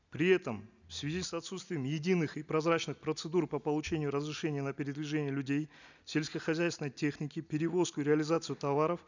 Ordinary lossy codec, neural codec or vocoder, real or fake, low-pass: none; none; real; 7.2 kHz